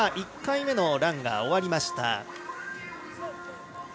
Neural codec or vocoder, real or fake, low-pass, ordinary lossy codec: none; real; none; none